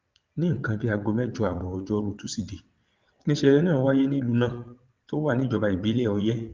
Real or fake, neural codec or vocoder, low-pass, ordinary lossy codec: fake; vocoder, 22.05 kHz, 80 mel bands, WaveNeXt; 7.2 kHz; Opus, 32 kbps